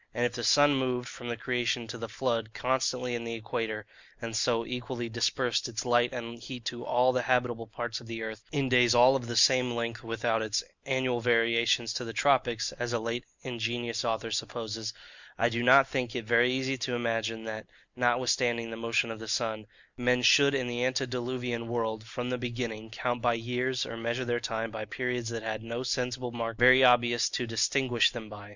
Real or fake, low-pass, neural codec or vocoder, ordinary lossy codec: real; 7.2 kHz; none; Opus, 64 kbps